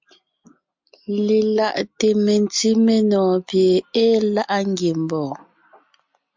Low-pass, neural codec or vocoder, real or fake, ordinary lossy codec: 7.2 kHz; none; real; MP3, 64 kbps